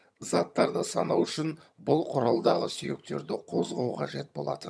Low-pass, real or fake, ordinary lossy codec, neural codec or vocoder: none; fake; none; vocoder, 22.05 kHz, 80 mel bands, HiFi-GAN